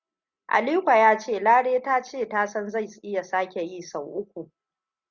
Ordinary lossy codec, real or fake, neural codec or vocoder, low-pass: Opus, 64 kbps; real; none; 7.2 kHz